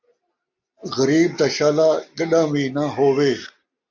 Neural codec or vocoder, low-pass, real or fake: none; 7.2 kHz; real